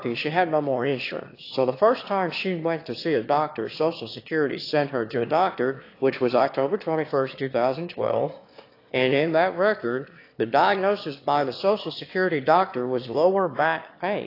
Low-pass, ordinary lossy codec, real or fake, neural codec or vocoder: 5.4 kHz; AAC, 32 kbps; fake; autoencoder, 22.05 kHz, a latent of 192 numbers a frame, VITS, trained on one speaker